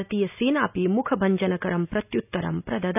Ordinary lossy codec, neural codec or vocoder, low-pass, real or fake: none; none; 3.6 kHz; real